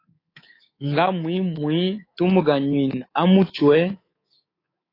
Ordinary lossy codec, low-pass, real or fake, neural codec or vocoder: AAC, 24 kbps; 5.4 kHz; fake; codec, 24 kHz, 3.1 kbps, DualCodec